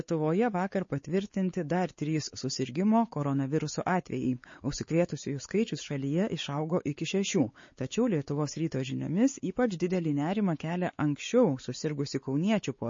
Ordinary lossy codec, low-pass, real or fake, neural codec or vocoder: MP3, 32 kbps; 7.2 kHz; fake; codec, 16 kHz, 4 kbps, X-Codec, WavLM features, trained on Multilingual LibriSpeech